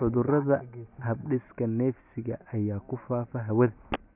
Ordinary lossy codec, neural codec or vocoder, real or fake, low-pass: none; none; real; 3.6 kHz